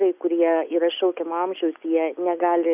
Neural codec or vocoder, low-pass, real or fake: none; 3.6 kHz; real